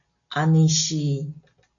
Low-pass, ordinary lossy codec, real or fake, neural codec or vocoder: 7.2 kHz; MP3, 64 kbps; real; none